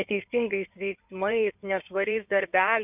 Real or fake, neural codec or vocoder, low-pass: fake; codec, 16 kHz in and 24 kHz out, 2.2 kbps, FireRedTTS-2 codec; 3.6 kHz